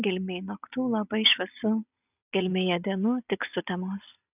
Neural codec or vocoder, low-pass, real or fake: none; 3.6 kHz; real